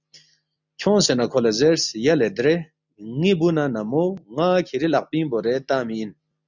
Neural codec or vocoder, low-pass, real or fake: none; 7.2 kHz; real